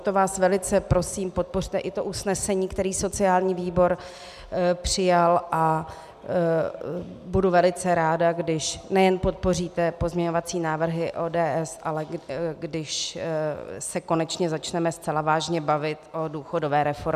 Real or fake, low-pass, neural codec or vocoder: real; 14.4 kHz; none